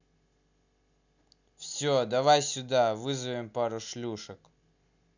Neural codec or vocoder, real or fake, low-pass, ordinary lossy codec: none; real; 7.2 kHz; none